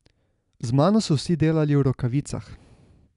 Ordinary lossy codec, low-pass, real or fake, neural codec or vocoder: none; 10.8 kHz; real; none